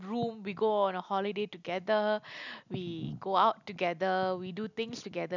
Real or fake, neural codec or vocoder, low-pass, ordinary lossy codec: real; none; 7.2 kHz; none